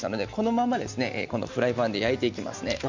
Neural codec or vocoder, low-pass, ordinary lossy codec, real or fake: none; 7.2 kHz; Opus, 64 kbps; real